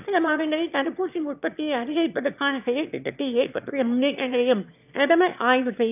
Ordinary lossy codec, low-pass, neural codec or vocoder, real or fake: none; 3.6 kHz; autoencoder, 22.05 kHz, a latent of 192 numbers a frame, VITS, trained on one speaker; fake